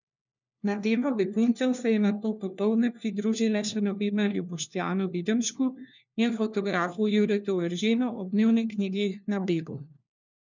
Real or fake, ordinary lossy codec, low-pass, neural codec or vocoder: fake; none; 7.2 kHz; codec, 16 kHz, 1 kbps, FunCodec, trained on LibriTTS, 50 frames a second